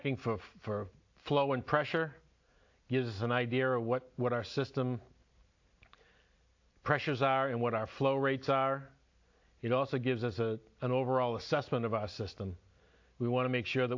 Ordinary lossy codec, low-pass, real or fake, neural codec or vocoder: AAC, 48 kbps; 7.2 kHz; real; none